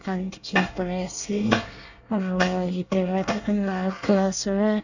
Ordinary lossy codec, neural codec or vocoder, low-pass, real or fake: none; codec, 24 kHz, 1 kbps, SNAC; 7.2 kHz; fake